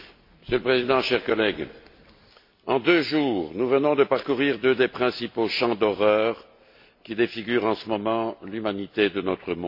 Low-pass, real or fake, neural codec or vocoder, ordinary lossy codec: 5.4 kHz; real; none; MP3, 32 kbps